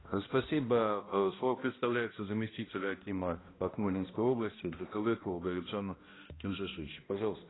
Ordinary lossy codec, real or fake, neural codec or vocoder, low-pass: AAC, 16 kbps; fake; codec, 16 kHz, 1 kbps, X-Codec, HuBERT features, trained on balanced general audio; 7.2 kHz